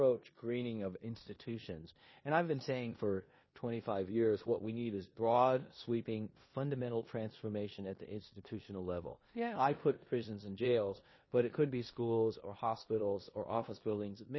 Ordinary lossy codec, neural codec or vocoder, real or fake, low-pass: MP3, 24 kbps; codec, 16 kHz in and 24 kHz out, 0.9 kbps, LongCat-Audio-Codec, four codebook decoder; fake; 7.2 kHz